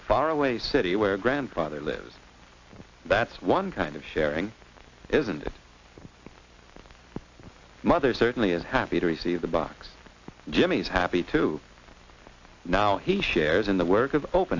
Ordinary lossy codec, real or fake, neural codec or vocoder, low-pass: AAC, 48 kbps; real; none; 7.2 kHz